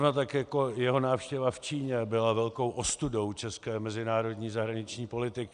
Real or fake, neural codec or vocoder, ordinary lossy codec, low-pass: real; none; Opus, 64 kbps; 9.9 kHz